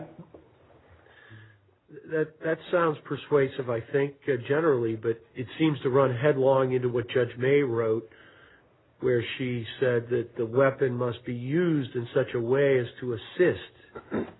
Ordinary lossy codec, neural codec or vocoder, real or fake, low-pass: AAC, 16 kbps; none; real; 7.2 kHz